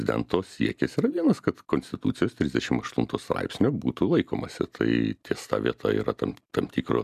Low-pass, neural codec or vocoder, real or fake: 14.4 kHz; none; real